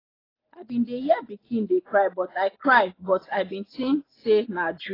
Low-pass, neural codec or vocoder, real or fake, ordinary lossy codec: 5.4 kHz; none; real; AAC, 24 kbps